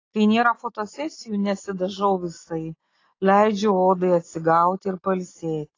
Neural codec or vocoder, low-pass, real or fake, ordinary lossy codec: none; 7.2 kHz; real; AAC, 32 kbps